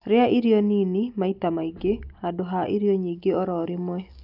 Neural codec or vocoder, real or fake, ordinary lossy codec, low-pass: none; real; none; 5.4 kHz